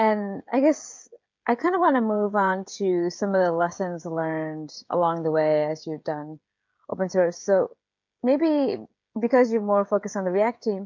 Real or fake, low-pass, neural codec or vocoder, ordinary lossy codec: fake; 7.2 kHz; codec, 16 kHz, 16 kbps, FreqCodec, smaller model; MP3, 48 kbps